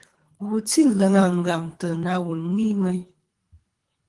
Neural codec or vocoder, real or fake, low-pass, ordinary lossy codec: codec, 24 kHz, 3 kbps, HILCodec; fake; 10.8 kHz; Opus, 24 kbps